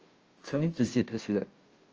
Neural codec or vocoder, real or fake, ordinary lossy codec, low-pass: codec, 16 kHz, 0.5 kbps, FunCodec, trained on Chinese and English, 25 frames a second; fake; Opus, 24 kbps; 7.2 kHz